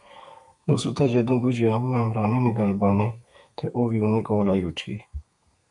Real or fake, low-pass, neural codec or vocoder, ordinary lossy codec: fake; 10.8 kHz; codec, 32 kHz, 1.9 kbps, SNAC; MP3, 96 kbps